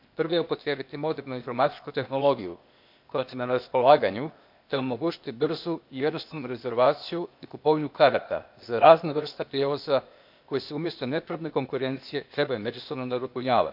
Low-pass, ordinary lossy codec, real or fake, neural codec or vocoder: 5.4 kHz; MP3, 48 kbps; fake; codec, 16 kHz, 0.8 kbps, ZipCodec